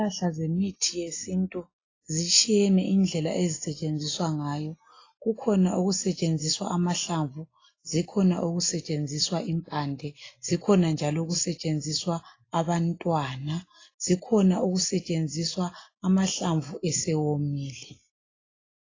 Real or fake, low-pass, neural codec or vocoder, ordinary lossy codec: real; 7.2 kHz; none; AAC, 32 kbps